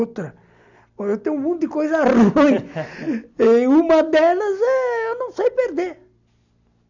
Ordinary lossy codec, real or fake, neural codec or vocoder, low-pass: none; real; none; 7.2 kHz